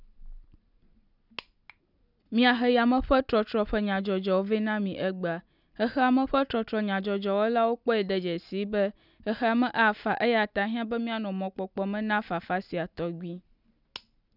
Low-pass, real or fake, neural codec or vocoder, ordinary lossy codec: 5.4 kHz; real; none; none